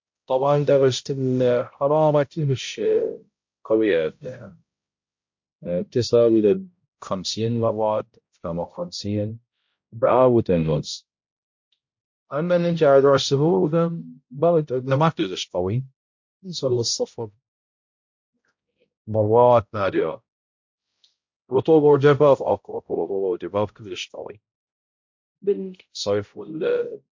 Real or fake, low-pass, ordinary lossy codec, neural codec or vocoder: fake; 7.2 kHz; MP3, 48 kbps; codec, 16 kHz, 0.5 kbps, X-Codec, HuBERT features, trained on balanced general audio